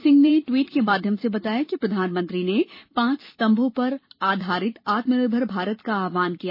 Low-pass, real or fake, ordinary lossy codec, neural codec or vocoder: 5.4 kHz; fake; MP3, 24 kbps; vocoder, 44.1 kHz, 128 mel bands every 256 samples, BigVGAN v2